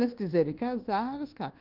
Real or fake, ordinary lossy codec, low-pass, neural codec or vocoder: fake; Opus, 16 kbps; 5.4 kHz; codec, 24 kHz, 1.2 kbps, DualCodec